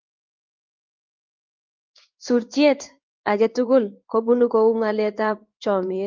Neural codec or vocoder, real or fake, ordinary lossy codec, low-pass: codec, 16 kHz in and 24 kHz out, 1 kbps, XY-Tokenizer; fake; Opus, 24 kbps; 7.2 kHz